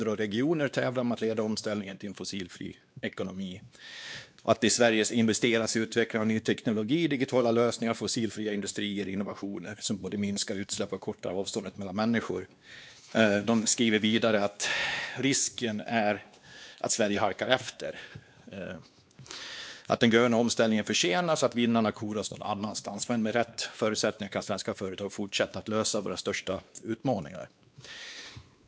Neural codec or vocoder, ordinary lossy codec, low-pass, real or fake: codec, 16 kHz, 2 kbps, X-Codec, WavLM features, trained on Multilingual LibriSpeech; none; none; fake